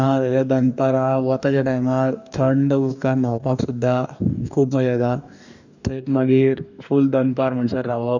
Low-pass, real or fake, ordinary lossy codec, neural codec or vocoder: 7.2 kHz; fake; none; codec, 44.1 kHz, 2.6 kbps, DAC